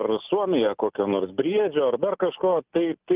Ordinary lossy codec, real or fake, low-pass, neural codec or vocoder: Opus, 32 kbps; real; 3.6 kHz; none